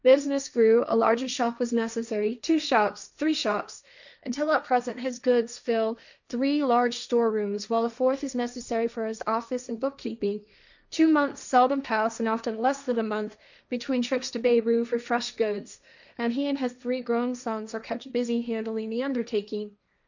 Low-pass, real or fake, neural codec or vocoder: 7.2 kHz; fake; codec, 16 kHz, 1.1 kbps, Voila-Tokenizer